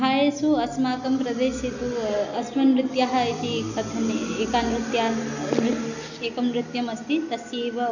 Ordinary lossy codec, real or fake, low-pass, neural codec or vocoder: none; real; 7.2 kHz; none